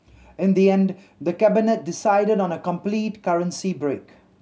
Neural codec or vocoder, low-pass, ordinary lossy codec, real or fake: none; none; none; real